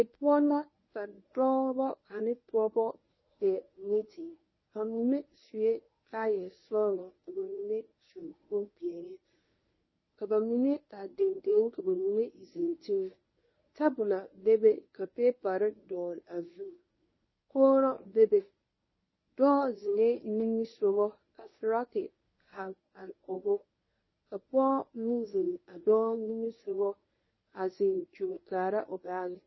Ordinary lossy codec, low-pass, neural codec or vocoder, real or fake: MP3, 24 kbps; 7.2 kHz; codec, 24 kHz, 0.9 kbps, WavTokenizer, medium speech release version 1; fake